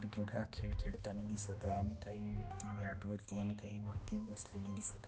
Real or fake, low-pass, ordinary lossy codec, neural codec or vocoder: fake; none; none; codec, 16 kHz, 1 kbps, X-Codec, HuBERT features, trained on balanced general audio